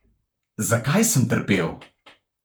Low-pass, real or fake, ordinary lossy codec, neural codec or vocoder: none; fake; none; vocoder, 44.1 kHz, 128 mel bands, Pupu-Vocoder